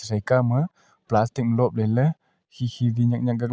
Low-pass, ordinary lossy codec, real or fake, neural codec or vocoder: none; none; real; none